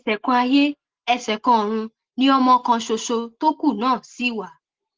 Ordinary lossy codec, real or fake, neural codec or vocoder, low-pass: Opus, 16 kbps; real; none; 7.2 kHz